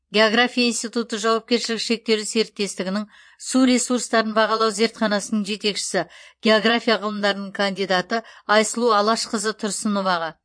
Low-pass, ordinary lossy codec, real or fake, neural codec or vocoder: 9.9 kHz; MP3, 48 kbps; fake; vocoder, 22.05 kHz, 80 mel bands, Vocos